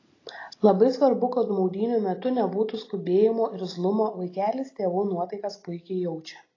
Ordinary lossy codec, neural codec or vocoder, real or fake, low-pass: AAC, 32 kbps; none; real; 7.2 kHz